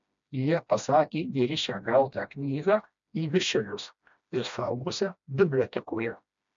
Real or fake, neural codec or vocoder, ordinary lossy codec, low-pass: fake; codec, 16 kHz, 1 kbps, FreqCodec, smaller model; MP3, 64 kbps; 7.2 kHz